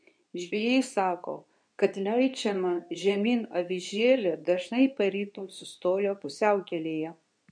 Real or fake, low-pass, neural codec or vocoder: fake; 9.9 kHz; codec, 24 kHz, 0.9 kbps, WavTokenizer, medium speech release version 2